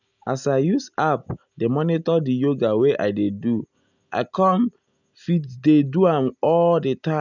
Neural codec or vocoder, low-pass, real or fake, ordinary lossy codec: none; 7.2 kHz; real; none